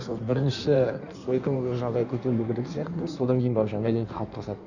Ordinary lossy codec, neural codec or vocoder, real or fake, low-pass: none; codec, 16 kHz in and 24 kHz out, 1.1 kbps, FireRedTTS-2 codec; fake; 7.2 kHz